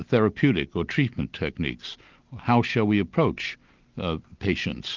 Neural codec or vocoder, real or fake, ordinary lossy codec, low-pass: none; real; Opus, 32 kbps; 7.2 kHz